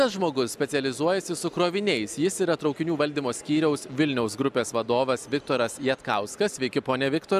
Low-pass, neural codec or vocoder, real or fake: 14.4 kHz; none; real